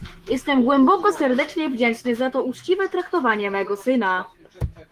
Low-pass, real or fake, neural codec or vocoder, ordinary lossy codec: 14.4 kHz; fake; autoencoder, 48 kHz, 128 numbers a frame, DAC-VAE, trained on Japanese speech; Opus, 24 kbps